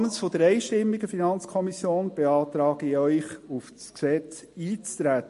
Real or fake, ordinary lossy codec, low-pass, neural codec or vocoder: real; MP3, 48 kbps; 14.4 kHz; none